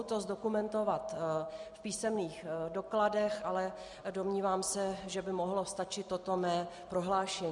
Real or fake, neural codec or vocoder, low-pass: real; none; 10.8 kHz